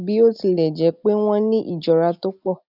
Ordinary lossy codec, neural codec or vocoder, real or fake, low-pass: none; none; real; 5.4 kHz